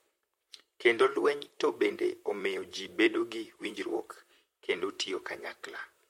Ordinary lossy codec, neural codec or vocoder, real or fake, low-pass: MP3, 64 kbps; vocoder, 44.1 kHz, 128 mel bands, Pupu-Vocoder; fake; 19.8 kHz